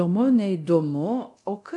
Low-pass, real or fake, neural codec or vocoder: 10.8 kHz; fake; codec, 24 kHz, 0.9 kbps, DualCodec